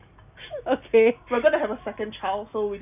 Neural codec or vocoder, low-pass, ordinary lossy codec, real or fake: none; 3.6 kHz; none; real